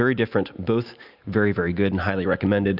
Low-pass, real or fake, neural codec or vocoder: 5.4 kHz; real; none